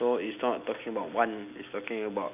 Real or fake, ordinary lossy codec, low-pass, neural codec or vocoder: real; none; 3.6 kHz; none